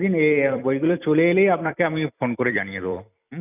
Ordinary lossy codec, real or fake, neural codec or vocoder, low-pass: none; fake; vocoder, 44.1 kHz, 128 mel bands every 256 samples, BigVGAN v2; 3.6 kHz